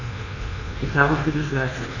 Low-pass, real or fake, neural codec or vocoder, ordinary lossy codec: 7.2 kHz; fake; codec, 24 kHz, 1.2 kbps, DualCodec; none